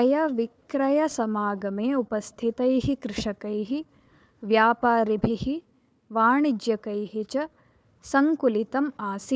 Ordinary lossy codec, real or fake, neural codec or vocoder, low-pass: none; fake; codec, 16 kHz, 4 kbps, FunCodec, trained on Chinese and English, 50 frames a second; none